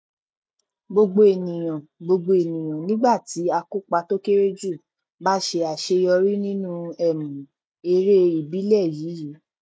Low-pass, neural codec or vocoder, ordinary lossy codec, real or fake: none; none; none; real